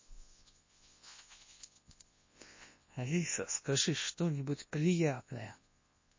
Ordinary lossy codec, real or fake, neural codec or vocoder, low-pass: MP3, 32 kbps; fake; codec, 24 kHz, 0.9 kbps, WavTokenizer, large speech release; 7.2 kHz